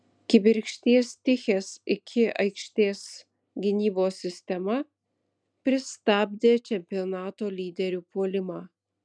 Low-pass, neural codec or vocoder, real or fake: 9.9 kHz; none; real